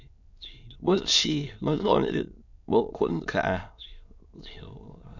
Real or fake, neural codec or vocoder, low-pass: fake; autoencoder, 22.05 kHz, a latent of 192 numbers a frame, VITS, trained on many speakers; 7.2 kHz